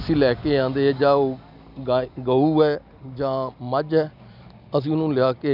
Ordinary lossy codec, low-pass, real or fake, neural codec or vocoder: none; 5.4 kHz; real; none